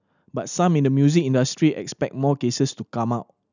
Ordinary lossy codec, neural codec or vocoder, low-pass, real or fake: none; none; 7.2 kHz; real